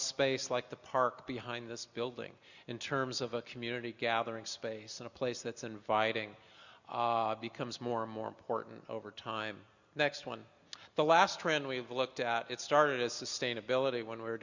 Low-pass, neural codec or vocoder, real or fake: 7.2 kHz; none; real